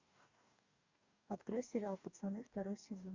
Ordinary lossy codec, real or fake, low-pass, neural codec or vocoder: Opus, 64 kbps; fake; 7.2 kHz; codec, 44.1 kHz, 2.6 kbps, DAC